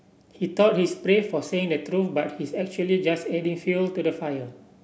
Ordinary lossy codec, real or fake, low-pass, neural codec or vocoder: none; real; none; none